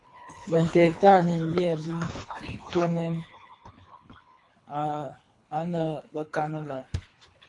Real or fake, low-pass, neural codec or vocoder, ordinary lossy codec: fake; 10.8 kHz; codec, 24 kHz, 3 kbps, HILCodec; AAC, 48 kbps